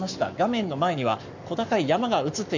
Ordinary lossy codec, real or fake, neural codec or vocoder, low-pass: none; fake; codec, 44.1 kHz, 7.8 kbps, Pupu-Codec; 7.2 kHz